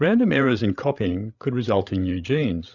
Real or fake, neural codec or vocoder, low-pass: fake; codec, 16 kHz, 8 kbps, FreqCodec, larger model; 7.2 kHz